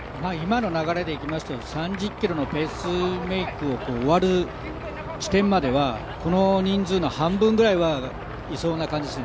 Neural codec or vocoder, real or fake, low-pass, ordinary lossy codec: none; real; none; none